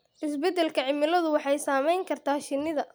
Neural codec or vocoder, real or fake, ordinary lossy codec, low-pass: none; real; none; none